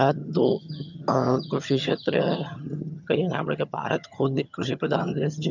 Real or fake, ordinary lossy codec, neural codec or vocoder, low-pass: fake; none; vocoder, 22.05 kHz, 80 mel bands, HiFi-GAN; 7.2 kHz